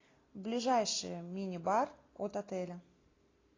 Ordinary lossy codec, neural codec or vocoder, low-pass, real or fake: AAC, 32 kbps; none; 7.2 kHz; real